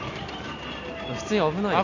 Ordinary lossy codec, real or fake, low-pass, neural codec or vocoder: none; real; 7.2 kHz; none